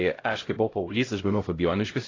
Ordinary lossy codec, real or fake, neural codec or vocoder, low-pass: AAC, 32 kbps; fake; codec, 16 kHz, 0.5 kbps, X-Codec, HuBERT features, trained on LibriSpeech; 7.2 kHz